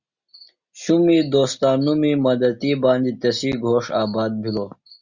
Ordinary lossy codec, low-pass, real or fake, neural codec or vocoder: Opus, 64 kbps; 7.2 kHz; real; none